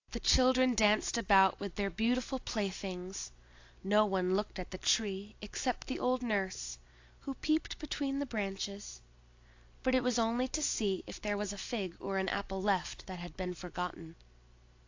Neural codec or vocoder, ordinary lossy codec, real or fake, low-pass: none; AAC, 48 kbps; real; 7.2 kHz